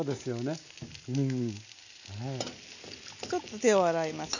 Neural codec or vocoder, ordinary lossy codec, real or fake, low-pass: none; none; real; 7.2 kHz